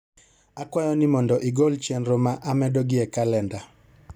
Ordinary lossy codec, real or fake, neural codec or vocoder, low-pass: none; real; none; 19.8 kHz